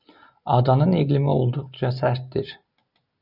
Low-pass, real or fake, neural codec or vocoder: 5.4 kHz; real; none